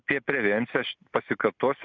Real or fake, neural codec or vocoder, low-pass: real; none; 7.2 kHz